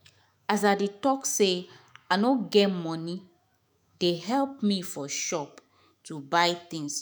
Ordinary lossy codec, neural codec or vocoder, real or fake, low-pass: none; autoencoder, 48 kHz, 128 numbers a frame, DAC-VAE, trained on Japanese speech; fake; none